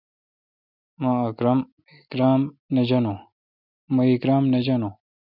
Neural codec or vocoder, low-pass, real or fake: none; 5.4 kHz; real